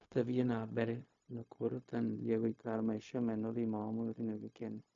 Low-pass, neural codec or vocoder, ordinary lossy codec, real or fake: 7.2 kHz; codec, 16 kHz, 0.4 kbps, LongCat-Audio-Codec; MP3, 48 kbps; fake